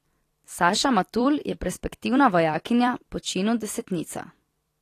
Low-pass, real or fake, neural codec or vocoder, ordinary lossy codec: 14.4 kHz; fake; vocoder, 44.1 kHz, 128 mel bands every 256 samples, BigVGAN v2; AAC, 48 kbps